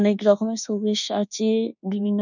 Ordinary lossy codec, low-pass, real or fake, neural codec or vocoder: none; 7.2 kHz; fake; codec, 24 kHz, 1.2 kbps, DualCodec